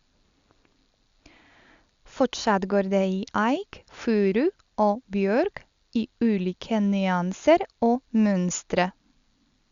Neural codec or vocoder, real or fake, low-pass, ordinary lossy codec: none; real; 7.2 kHz; Opus, 64 kbps